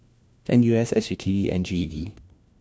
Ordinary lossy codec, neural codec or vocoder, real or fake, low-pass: none; codec, 16 kHz, 1 kbps, FunCodec, trained on LibriTTS, 50 frames a second; fake; none